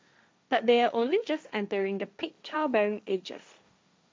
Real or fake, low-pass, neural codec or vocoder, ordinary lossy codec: fake; none; codec, 16 kHz, 1.1 kbps, Voila-Tokenizer; none